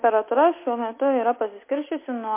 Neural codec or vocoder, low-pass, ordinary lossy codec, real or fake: none; 3.6 kHz; MP3, 24 kbps; real